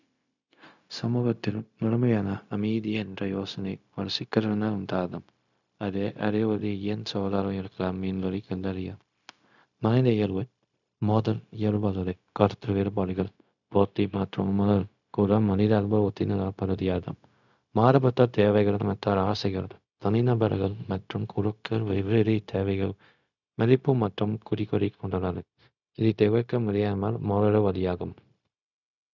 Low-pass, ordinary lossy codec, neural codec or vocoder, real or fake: 7.2 kHz; none; codec, 16 kHz, 0.4 kbps, LongCat-Audio-Codec; fake